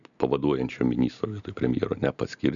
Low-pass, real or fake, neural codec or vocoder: 7.2 kHz; real; none